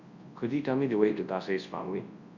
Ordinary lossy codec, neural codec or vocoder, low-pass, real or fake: Opus, 64 kbps; codec, 24 kHz, 0.9 kbps, WavTokenizer, large speech release; 7.2 kHz; fake